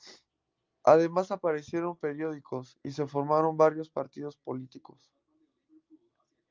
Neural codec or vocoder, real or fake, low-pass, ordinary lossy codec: none; real; 7.2 kHz; Opus, 24 kbps